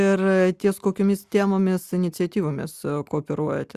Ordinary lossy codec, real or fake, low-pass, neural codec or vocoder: Opus, 64 kbps; real; 14.4 kHz; none